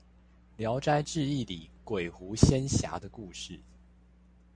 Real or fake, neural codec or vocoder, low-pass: real; none; 9.9 kHz